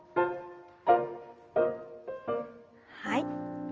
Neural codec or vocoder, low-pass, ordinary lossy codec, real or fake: none; 7.2 kHz; Opus, 24 kbps; real